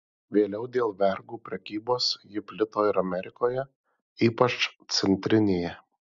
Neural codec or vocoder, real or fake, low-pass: none; real; 7.2 kHz